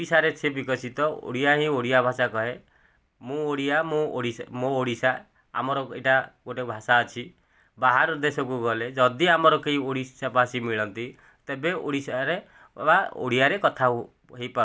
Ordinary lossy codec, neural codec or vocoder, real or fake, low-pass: none; none; real; none